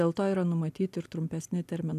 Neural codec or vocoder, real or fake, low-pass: none; real; 14.4 kHz